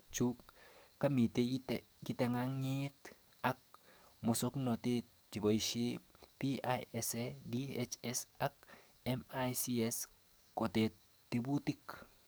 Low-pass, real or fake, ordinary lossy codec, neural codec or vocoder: none; fake; none; codec, 44.1 kHz, 7.8 kbps, DAC